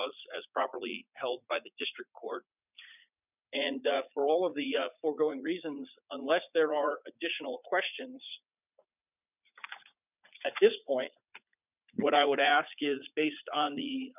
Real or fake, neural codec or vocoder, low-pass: fake; vocoder, 44.1 kHz, 80 mel bands, Vocos; 3.6 kHz